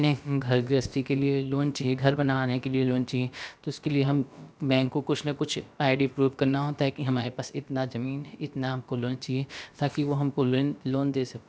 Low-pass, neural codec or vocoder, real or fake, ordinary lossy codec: none; codec, 16 kHz, about 1 kbps, DyCAST, with the encoder's durations; fake; none